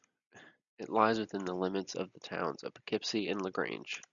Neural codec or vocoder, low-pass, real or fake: none; 7.2 kHz; real